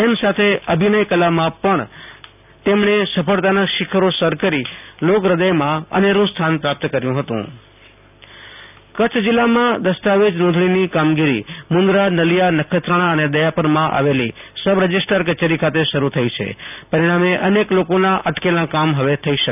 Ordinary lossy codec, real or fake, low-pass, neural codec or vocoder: none; real; 3.6 kHz; none